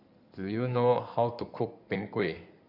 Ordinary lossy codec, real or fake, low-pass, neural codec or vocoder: none; fake; 5.4 kHz; codec, 16 kHz in and 24 kHz out, 2.2 kbps, FireRedTTS-2 codec